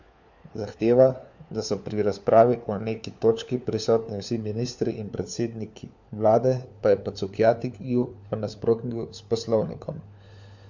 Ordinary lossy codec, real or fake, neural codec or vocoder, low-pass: MP3, 64 kbps; fake; codec, 16 kHz, 4 kbps, FunCodec, trained on LibriTTS, 50 frames a second; 7.2 kHz